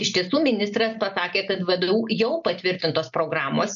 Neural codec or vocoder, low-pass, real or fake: none; 7.2 kHz; real